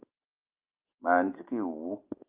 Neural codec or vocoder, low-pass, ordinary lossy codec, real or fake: none; 3.6 kHz; Opus, 32 kbps; real